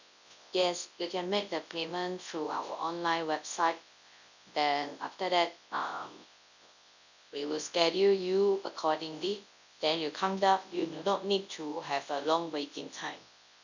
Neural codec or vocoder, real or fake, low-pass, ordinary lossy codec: codec, 24 kHz, 0.9 kbps, WavTokenizer, large speech release; fake; 7.2 kHz; none